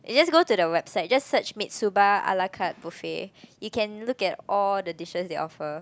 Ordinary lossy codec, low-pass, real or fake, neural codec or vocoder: none; none; real; none